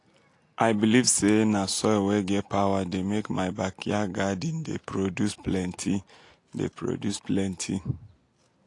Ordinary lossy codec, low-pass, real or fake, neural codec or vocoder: AAC, 48 kbps; 10.8 kHz; real; none